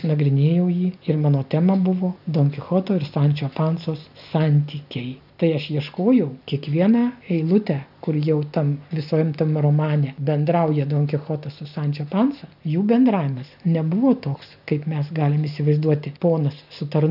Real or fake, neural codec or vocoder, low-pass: real; none; 5.4 kHz